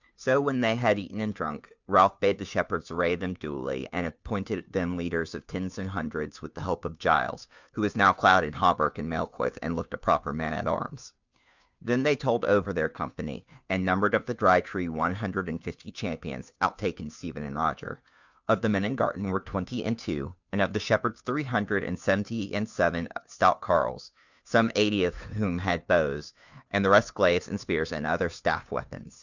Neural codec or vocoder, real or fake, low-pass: codec, 16 kHz, 2 kbps, FunCodec, trained on Chinese and English, 25 frames a second; fake; 7.2 kHz